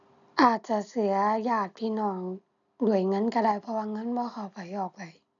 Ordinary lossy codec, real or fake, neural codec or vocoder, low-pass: none; real; none; 7.2 kHz